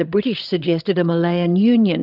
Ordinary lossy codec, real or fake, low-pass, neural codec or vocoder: Opus, 24 kbps; fake; 5.4 kHz; codec, 16 kHz, 16 kbps, FreqCodec, larger model